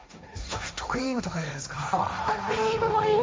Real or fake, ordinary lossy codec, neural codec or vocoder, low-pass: fake; none; codec, 16 kHz, 1.1 kbps, Voila-Tokenizer; none